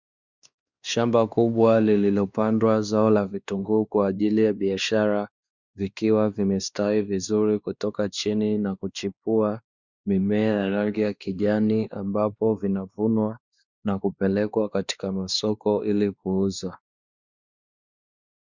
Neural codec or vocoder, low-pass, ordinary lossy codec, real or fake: codec, 16 kHz, 2 kbps, X-Codec, WavLM features, trained on Multilingual LibriSpeech; 7.2 kHz; Opus, 64 kbps; fake